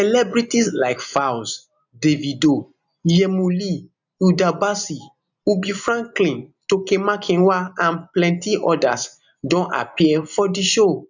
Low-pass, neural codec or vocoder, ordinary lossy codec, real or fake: 7.2 kHz; none; none; real